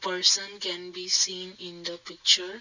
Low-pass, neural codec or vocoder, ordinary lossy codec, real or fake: 7.2 kHz; none; none; real